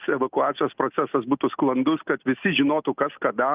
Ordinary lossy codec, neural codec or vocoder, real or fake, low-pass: Opus, 24 kbps; none; real; 3.6 kHz